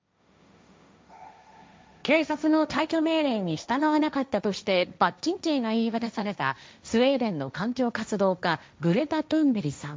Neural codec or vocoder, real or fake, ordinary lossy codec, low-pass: codec, 16 kHz, 1.1 kbps, Voila-Tokenizer; fake; none; none